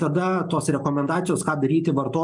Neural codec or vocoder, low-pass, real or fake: none; 10.8 kHz; real